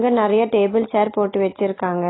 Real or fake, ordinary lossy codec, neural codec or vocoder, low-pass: real; AAC, 16 kbps; none; 7.2 kHz